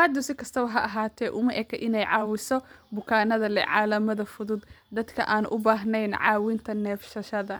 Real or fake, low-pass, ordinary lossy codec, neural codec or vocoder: fake; none; none; vocoder, 44.1 kHz, 128 mel bands every 512 samples, BigVGAN v2